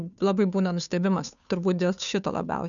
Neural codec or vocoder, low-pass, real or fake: codec, 16 kHz, 2 kbps, FunCodec, trained on Chinese and English, 25 frames a second; 7.2 kHz; fake